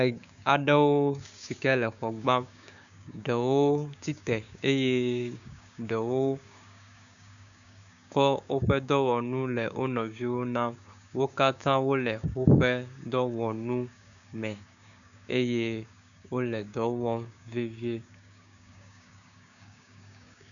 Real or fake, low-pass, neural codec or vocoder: fake; 7.2 kHz; codec, 16 kHz, 6 kbps, DAC